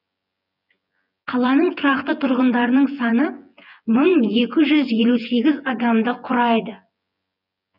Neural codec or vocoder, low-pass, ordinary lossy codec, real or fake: vocoder, 24 kHz, 100 mel bands, Vocos; 5.4 kHz; none; fake